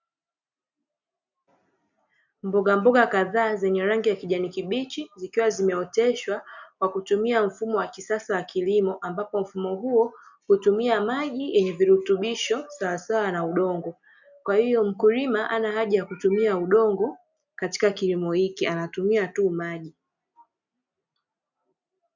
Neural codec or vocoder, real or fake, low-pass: none; real; 7.2 kHz